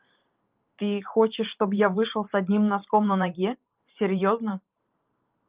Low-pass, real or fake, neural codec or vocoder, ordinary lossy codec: 3.6 kHz; fake; vocoder, 44.1 kHz, 128 mel bands every 512 samples, BigVGAN v2; Opus, 32 kbps